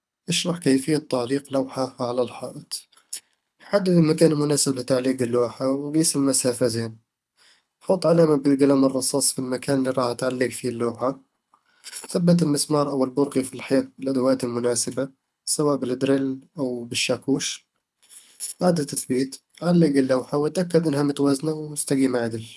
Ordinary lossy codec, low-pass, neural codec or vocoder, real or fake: none; none; codec, 24 kHz, 6 kbps, HILCodec; fake